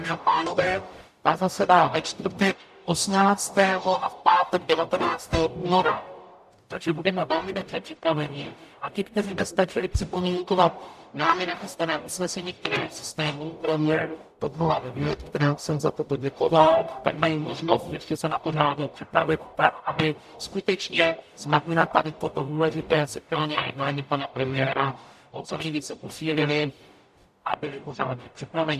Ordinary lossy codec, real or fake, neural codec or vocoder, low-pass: AAC, 96 kbps; fake; codec, 44.1 kHz, 0.9 kbps, DAC; 14.4 kHz